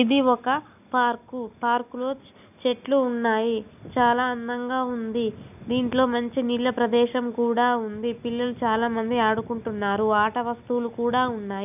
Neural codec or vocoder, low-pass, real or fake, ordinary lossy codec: none; 3.6 kHz; real; none